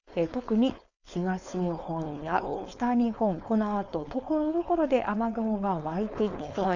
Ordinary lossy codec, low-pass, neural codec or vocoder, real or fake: none; 7.2 kHz; codec, 16 kHz, 4.8 kbps, FACodec; fake